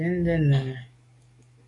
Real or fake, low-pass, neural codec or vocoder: fake; 10.8 kHz; codec, 44.1 kHz, 7.8 kbps, DAC